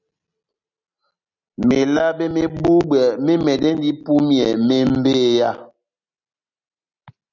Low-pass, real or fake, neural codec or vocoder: 7.2 kHz; real; none